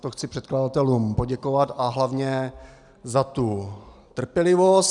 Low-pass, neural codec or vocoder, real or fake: 10.8 kHz; none; real